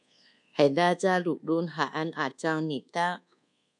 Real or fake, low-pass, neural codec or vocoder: fake; 10.8 kHz; codec, 24 kHz, 1.2 kbps, DualCodec